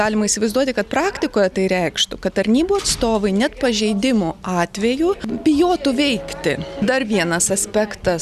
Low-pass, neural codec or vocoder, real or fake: 14.4 kHz; none; real